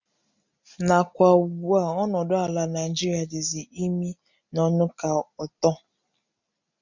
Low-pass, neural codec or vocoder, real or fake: 7.2 kHz; none; real